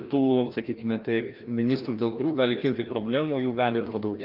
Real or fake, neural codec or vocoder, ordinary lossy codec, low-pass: fake; codec, 16 kHz, 1 kbps, FreqCodec, larger model; Opus, 32 kbps; 5.4 kHz